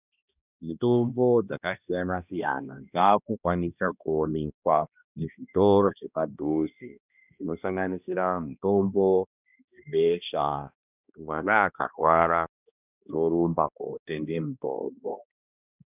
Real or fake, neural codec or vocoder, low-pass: fake; codec, 16 kHz, 1 kbps, X-Codec, HuBERT features, trained on balanced general audio; 3.6 kHz